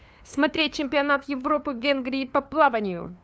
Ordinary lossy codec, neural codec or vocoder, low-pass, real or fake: none; codec, 16 kHz, 2 kbps, FunCodec, trained on LibriTTS, 25 frames a second; none; fake